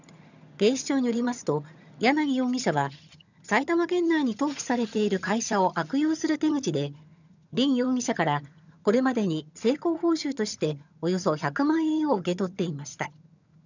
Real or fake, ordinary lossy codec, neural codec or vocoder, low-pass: fake; none; vocoder, 22.05 kHz, 80 mel bands, HiFi-GAN; 7.2 kHz